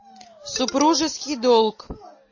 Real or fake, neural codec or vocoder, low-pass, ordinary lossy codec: real; none; 7.2 kHz; MP3, 32 kbps